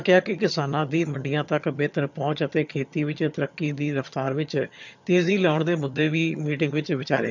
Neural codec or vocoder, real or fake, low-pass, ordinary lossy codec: vocoder, 22.05 kHz, 80 mel bands, HiFi-GAN; fake; 7.2 kHz; none